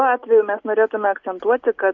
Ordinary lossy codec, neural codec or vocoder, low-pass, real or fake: MP3, 48 kbps; none; 7.2 kHz; real